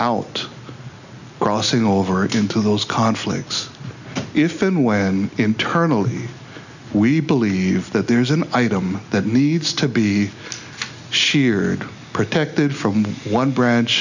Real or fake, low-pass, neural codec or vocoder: real; 7.2 kHz; none